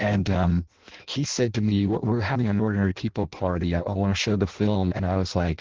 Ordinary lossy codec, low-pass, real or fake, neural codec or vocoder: Opus, 16 kbps; 7.2 kHz; fake; codec, 16 kHz in and 24 kHz out, 0.6 kbps, FireRedTTS-2 codec